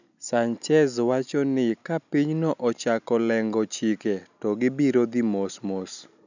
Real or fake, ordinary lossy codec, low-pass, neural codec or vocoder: real; none; 7.2 kHz; none